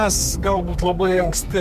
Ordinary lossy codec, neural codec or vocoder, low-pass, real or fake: MP3, 96 kbps; codec, 32 kHz, 1.9 kbps, SNAC; 14.4 kHz; fake